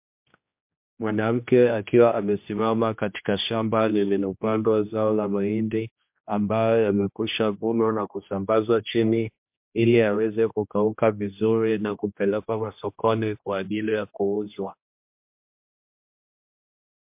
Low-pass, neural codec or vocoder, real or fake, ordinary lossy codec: 3.6 kHz; codec, 16 kHz, 1 kbps, X-Codec, HuBERT features, trained on general audio; fake; MP3, 32 kbps